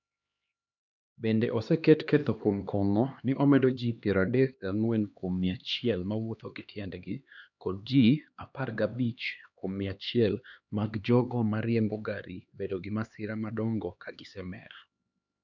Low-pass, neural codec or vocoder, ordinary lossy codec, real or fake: 7.2 kHz; codec, 16 kHz, 2 kbps, X-Codec, HuBERT features, trained on LibriSpeech; none; fake